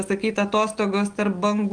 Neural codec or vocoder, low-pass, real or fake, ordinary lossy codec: none; 9.9 kHz; real; Opus, 24 kbps